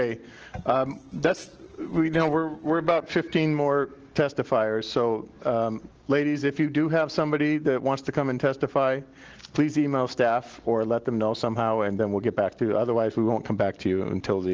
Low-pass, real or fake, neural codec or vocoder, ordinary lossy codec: 7.2 kHz; real; none; Opus, 16 kbps